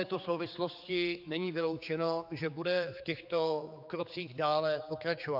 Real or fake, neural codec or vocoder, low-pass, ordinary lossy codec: fake; codec, 16 kHz, 4 kbps, X-Codec, HuBERT features, trained on general audio; 5.4 kHz; AAC, 48 kbps